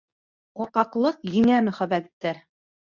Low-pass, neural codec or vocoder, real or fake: 7.2 kHz; codec, 24 kHz, 0.9 kbps, WavTokenizer, medium speech release version 2; fake